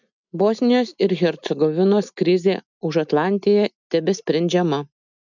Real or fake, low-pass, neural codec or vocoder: real; 7.2 kHz; none